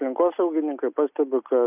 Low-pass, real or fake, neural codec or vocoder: 3.6 kHz; real; none